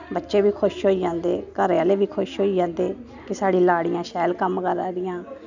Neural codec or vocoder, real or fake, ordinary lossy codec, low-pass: none; real; none; 7.2 kHz